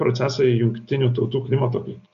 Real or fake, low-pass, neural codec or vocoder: real; 7.2 kHz; none